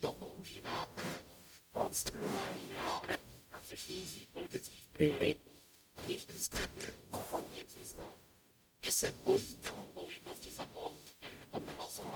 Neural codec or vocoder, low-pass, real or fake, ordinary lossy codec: codec, 44.1 kHz, 0.9 kbps, DAC; 19.8 kHz; fake; MP3, 96 kbps